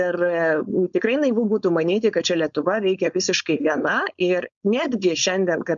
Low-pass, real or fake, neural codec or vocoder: 7.2 kHz; fake; codec, 16 kHz, 4.8 kbps, FACodec